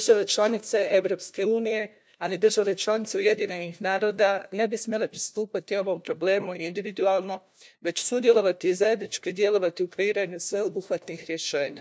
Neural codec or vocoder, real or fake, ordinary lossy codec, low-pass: codec, 16 kHz, 1 kbps, FunCodec, trained on LibriTTS, 50 frames a second; fake; none; none